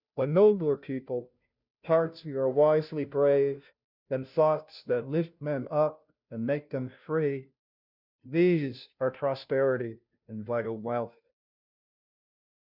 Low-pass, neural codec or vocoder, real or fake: 5.4 kHz; codec, 16 kHz, 0.5 kbps, FunCodec, trained on Chinese and English, 25 frames a second; fake